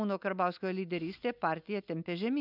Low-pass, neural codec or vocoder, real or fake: 5.4 kHz; none; real